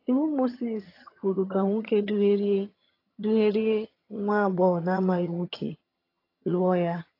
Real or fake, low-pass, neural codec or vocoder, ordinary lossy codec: fake; 5.4 kHz; vocoder, 22.05 kHz, 80 mel bands, HiFi-GAN; AAC, 32 kbps